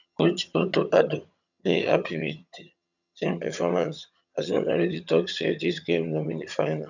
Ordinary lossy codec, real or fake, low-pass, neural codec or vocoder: none; fake; 7.2 kHz; vocoder, 22.05 kHz, 80 mel bands, HiFi-GAN